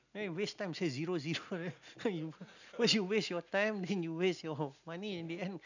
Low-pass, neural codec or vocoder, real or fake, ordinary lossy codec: 7.2 kHz; none; real; none